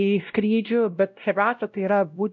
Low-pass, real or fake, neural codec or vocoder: 7.2 kHz; fake; codec, 16 kHz, 0.5 kbps, X-Codec, WavLM features, trained on Multilingual LibriSpeech